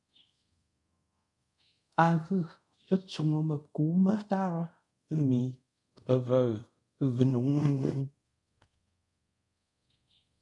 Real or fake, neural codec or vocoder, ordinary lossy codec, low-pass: fake; codec, 24 kHz, 0.5 kbps, DualCodec; MP3, 64 kbps; 10.8 kHz